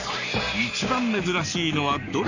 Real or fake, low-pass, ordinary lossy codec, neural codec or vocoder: fake; 7.2 kHz; none; codec, 44.1 kHz, 7.8 kbps, Pupu-Codec